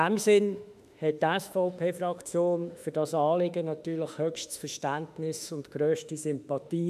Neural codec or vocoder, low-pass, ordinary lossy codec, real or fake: autoencoder, 48 kHz, 32 numbers a frame, DAC-VAE, trained on Japanese speech; 14.4 kHz; none; fake